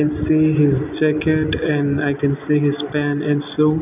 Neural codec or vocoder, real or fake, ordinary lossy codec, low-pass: none; real; none; 3.6 kHz